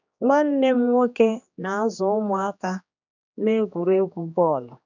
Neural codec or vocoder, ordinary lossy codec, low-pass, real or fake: codec, 16 kHz, 2 kbps, X-Codec, HuBERT features, trained on general audio; none; 7.2 kHz; fake